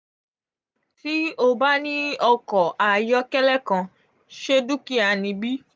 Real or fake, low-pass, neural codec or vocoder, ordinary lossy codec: real; none; none; none